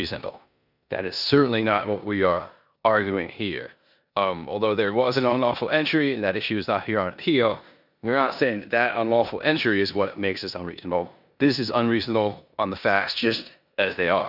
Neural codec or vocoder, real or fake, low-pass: codec, 16 kHz in and 24 kHz out, 0.9 kbps, LongCat-Audio-Codec, four codebook decoder; fake; 5.4 kHz